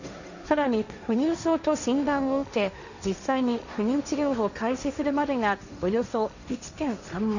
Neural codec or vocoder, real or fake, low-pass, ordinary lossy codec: codec, 16 kHz, 1.1 kbps, Voila-Tokenizer; fake; 7.2 kHz; none